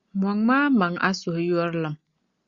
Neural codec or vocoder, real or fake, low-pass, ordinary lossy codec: none; real; 7.2 kHz; Opus, 64 kbps